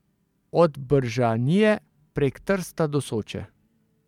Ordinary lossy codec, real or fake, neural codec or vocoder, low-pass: none; fake; vocoder, 44.1 kHz, 128 mel bands every 512 samples, BigVGAN v2; 19.8 kHz